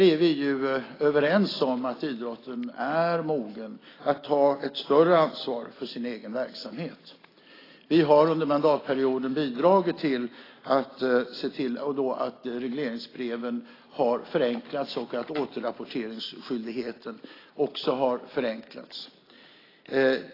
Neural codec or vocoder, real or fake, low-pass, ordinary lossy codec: none; real; 5.4 kHz; AAC, 24 kbps